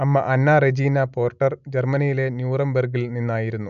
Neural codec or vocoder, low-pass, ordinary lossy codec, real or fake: none; 7.2 kHz; none; real